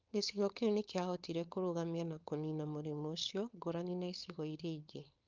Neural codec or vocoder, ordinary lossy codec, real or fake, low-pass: codec, 16 kHz, 4.8 kbps, FACodec; Opus, 24 kbps; fake; 7.2 kHz